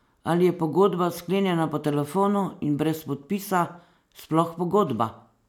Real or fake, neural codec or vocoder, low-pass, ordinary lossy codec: real; none; 19.8 kHz; none